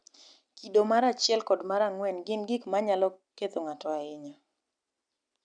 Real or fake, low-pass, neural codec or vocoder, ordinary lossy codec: real; 9.9 kHz; none; none